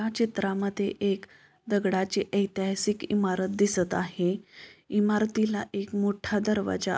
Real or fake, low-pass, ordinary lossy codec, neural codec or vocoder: real; none; none; none